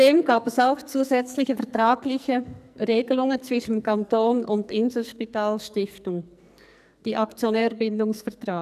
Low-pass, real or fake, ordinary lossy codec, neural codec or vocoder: 14.4 kHz; fake; none; codec, 44.1 kHz, 2.6 kbps, SNAC